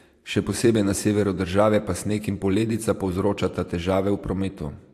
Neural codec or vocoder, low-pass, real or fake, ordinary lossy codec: vocoder, 44.1 kHz, 128 mel bands every 512 samples, BigVGAN v2; 14.4 kHz; fake; AAC, 64 kbps